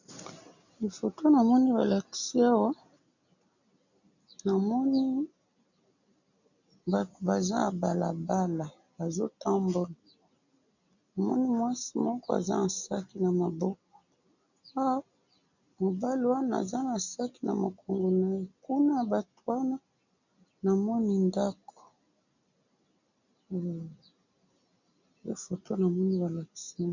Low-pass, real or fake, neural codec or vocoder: 7.2 kHz; real; none